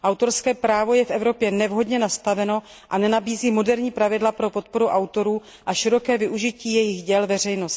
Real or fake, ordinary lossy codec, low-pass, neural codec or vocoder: real; none; none; none